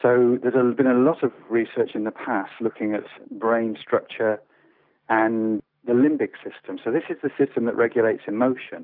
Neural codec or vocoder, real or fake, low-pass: none; real; 5.4 kHz